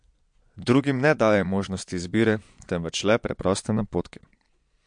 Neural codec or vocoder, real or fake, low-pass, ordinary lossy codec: vocoder, 22.05 kHz, 80 mel bands, Vocos; fake; 9.9 kHz; MP3, 64 kbps